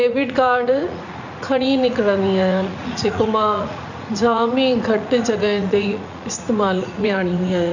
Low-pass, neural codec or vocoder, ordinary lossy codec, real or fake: 7.2 kHz; vocoder, 44.1 kHz, 80 mel bands, Vocos; none; fake